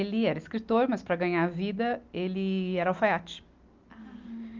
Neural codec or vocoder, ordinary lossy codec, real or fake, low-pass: none; Opus, 24 kbps; real; 7.2 kHz